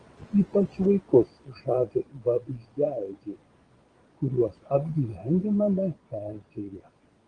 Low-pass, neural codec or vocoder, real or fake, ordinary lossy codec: 9.9 kHz; vocoder, 22.05 kHz, 80 mel bands, Vocos; fake; Opus, 24 kbps